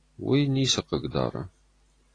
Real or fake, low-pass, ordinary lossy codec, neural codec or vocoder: real; 9.9 kHz; AAC, 32 kbps; none